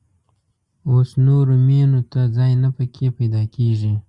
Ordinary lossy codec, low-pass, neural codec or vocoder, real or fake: Opus, 64 kbps; 10.8 kHz; none; real